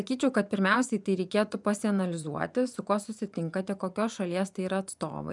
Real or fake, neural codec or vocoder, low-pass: fake; vocoder, 24 kHz, 100 mel bands, Vocos; 10.8 kHz